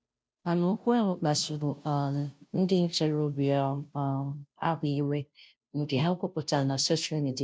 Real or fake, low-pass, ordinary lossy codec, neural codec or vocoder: fake; none; none; codec, 16 kHz, 0.5 kbps, FunCodec, trained on Chinese and English, 25 frames a second